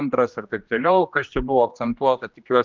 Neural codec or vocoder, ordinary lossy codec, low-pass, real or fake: codec, 16 kHz, 1 kbps, X-Codec, HuBERT features, trained on general audio; Opus, 24 kbps; 7.2 kHz; fake